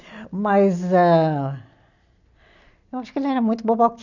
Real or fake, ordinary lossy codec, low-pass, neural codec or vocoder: real; none; 7.2 kHz; none